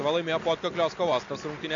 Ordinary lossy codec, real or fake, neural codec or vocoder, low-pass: AAC, 48 kbps; real; none; 7.2 kHz